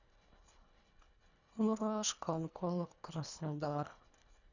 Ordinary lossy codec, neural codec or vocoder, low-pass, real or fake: none; codec, 24 kHz, 1.5 kbps, HILCodec; 7.2 kHz; fake